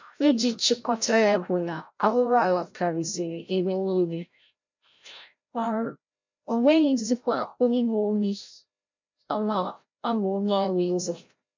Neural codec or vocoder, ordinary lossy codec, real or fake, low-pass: codec, 16 kHz, 0.5 kbps, FreqCodec, larger model; MP3, 64 kbps; fake; 7.2 kHz